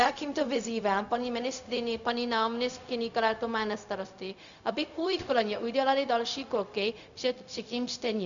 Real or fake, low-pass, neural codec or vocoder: fake; 7.2 kHz; codec, 16 kHz, 0.4 kbps, LongCat-Audio-Codec